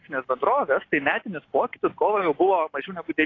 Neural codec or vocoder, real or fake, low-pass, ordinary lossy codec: none; real; 7.2 kHz; AAC, 32 kbps